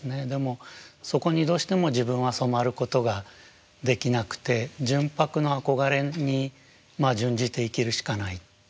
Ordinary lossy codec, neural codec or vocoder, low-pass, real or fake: none; none; none; real